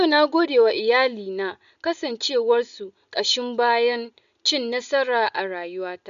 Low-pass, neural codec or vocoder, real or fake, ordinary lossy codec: 7.2 kHz; none; real; none